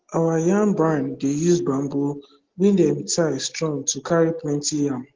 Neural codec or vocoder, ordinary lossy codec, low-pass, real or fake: none; Opus, 16 kbps; 7.2 kHz; real